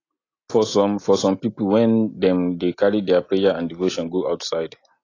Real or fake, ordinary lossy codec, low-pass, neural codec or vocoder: real; AAC, 32 kbps; 7.2 kHz; none